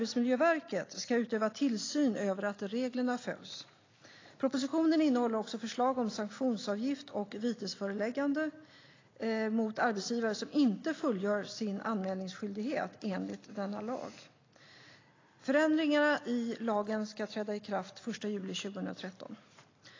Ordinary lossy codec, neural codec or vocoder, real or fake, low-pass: AAC, 32 kbps; none; real; 7.2 kHz